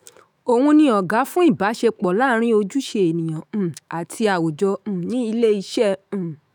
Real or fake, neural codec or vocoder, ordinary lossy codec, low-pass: fake; autoencoder, 48 kHz, 128 numbers a frame, DAC-VAE, trained on Japanese speech; none; none